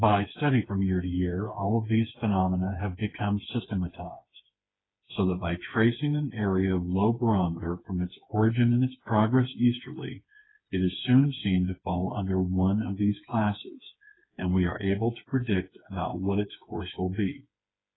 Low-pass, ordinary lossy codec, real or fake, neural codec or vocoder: 7.2 kHz; AAC, 16 kbps; fake; codec, 16 kHz, 4 kbps, FreqCodec, smaller model